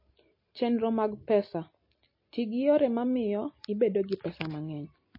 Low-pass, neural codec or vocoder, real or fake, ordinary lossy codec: 5.4 kHz; none; real; MP3, 24 kbps